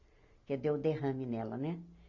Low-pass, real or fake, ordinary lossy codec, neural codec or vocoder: 7.2 kHz; real; none; none